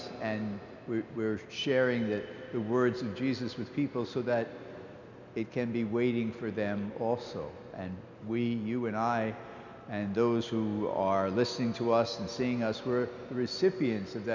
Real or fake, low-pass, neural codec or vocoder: real; 7.2 kHz; none